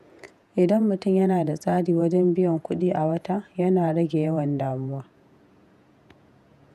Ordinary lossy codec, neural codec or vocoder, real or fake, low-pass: none; vocoder, 48 kHz, 128 mel bands, Vocos; fake; 14.4 kHz